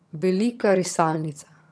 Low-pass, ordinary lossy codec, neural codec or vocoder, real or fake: none; none; vocoder, 22.05 kHz, 80 mel bands, HiFi-GAN; fake